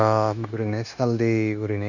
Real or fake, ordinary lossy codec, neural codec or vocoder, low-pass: fake; none; codec, 16 kHz, 0.9 kbps, LongCat-Audio-Codec; 7.2 kHz